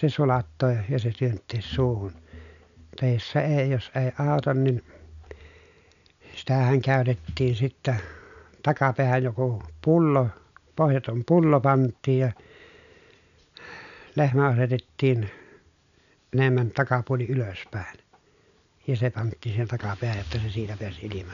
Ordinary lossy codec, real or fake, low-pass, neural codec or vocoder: none; real; 7.2 kHz; none